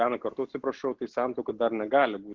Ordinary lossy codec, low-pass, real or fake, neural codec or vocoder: Opus, 16 kbps; 7.2 kHz; real; none